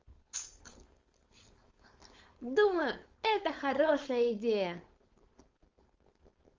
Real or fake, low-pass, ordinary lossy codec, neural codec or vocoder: fake; 7.2 kHz; Opus, 32 kbps; codec, 16 kHz, 4.8 kbps, FACodec